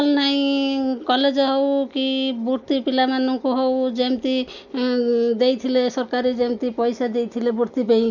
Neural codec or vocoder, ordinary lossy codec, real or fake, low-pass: none; none; real; 7.2 kHz